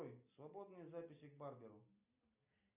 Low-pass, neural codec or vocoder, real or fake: 3.6 kHz; none; real